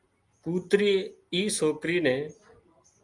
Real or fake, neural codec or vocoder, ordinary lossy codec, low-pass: real; none; Opus, 32 kbps; 10.8 kHz